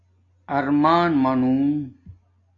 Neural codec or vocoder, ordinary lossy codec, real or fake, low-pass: none; AAC, 32 kbps; real; 7.2 kHz